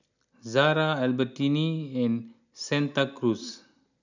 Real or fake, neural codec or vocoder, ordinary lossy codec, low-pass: real; none; none; 7.2 kHz